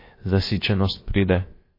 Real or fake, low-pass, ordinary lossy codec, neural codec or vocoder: fake; 5.4 kHz; MP3, 24 kbps; codec, 16 kHz, about 1 kbps, DyCAST, with the encoder's durations